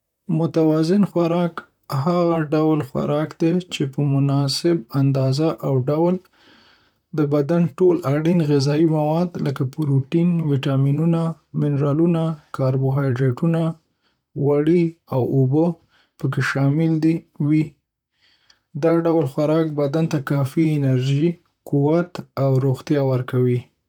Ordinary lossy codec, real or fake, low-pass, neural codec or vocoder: none; fake; 19.8 kHz; vocoder, 44.1 kHz, 128 mel bands, Pupu-Vocoder